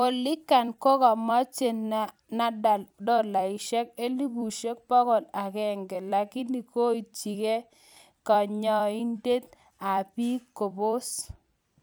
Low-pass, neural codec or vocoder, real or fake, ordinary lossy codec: none; vocoder, 44.1 kHz, 128 mel bands every 256 samples, BigVGAN v2; fake; none